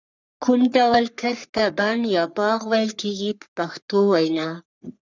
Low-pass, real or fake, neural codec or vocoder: 7.2 kHz; fake; codec, 44.1 kHz, 3.4 kbps, Pupu-Codec